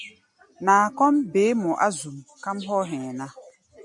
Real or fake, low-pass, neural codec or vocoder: real; 10.8 kHz; none